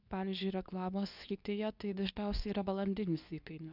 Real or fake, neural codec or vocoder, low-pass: fake; codec, 24 kHz, 0.9 kbps, WavTokenizer, medium speech release version 2; 5.4 kHz